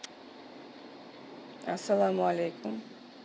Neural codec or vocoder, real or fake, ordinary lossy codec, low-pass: none; real; none; none